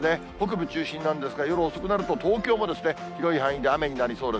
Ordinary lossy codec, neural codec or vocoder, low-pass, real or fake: none; none; none; real